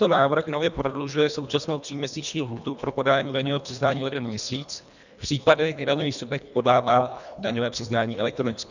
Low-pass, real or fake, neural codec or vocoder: 7.2 kHz; fake; codec, 24 kHz, 1.5 kbps, HILCodec